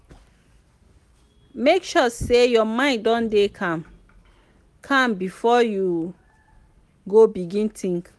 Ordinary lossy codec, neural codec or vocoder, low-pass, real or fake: none; none; none; real